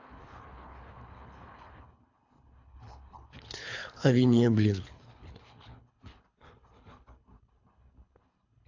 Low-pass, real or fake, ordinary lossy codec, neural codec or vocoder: 7.2 kHz; fake; AAC, 48 kbps; codec, 24 kHz, 3 kbps, HILCodec